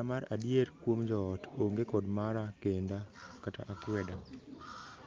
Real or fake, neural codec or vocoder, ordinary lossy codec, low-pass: real; none; Opus, 24 kbps; 7.2 kHz